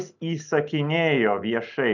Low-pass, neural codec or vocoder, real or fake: 7.2 kHz; none; real